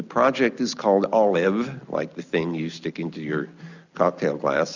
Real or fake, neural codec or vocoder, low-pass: real; none; 7.2 kHz